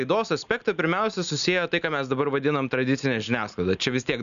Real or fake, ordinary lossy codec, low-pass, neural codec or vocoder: real; MP3, 96 kbps; 7.2 kHz; none